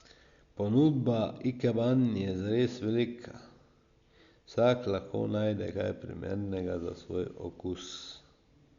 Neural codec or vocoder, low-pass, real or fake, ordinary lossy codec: none; 7.2 kHz; real; Opus, 64 kbps